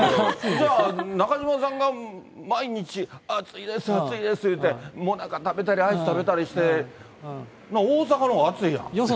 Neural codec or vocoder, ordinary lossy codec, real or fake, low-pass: none; none; real; none